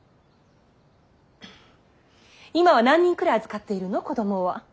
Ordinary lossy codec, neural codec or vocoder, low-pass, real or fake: none; none; none; real